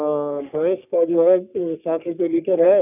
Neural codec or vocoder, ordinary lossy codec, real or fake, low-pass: codec, 44.1 kHz, 3.4 kbps, Pupu-Codec; none; fake; 3.6 kHz